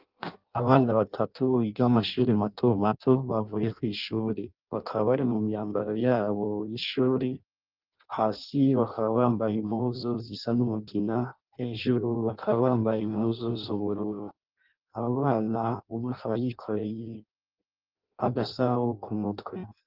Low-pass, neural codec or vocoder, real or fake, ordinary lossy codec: 5.4 kHz; codec, 16 kHz in and 24 kHz out, 0.6 kbps, FireRedTTS-2 codec; fake; Opus, 32 kbps